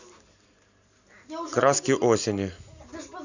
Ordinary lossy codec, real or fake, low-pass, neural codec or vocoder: none; real; 7.2 kHz; none